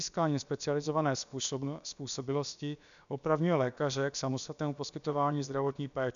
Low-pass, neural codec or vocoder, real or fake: 7.2 kHz; codec, 16 kHz, about 1 kbps, DyCAST, with the encoder's durations; fake